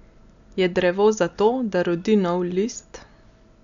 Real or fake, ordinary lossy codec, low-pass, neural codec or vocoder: real; none; 7.2 kHz; none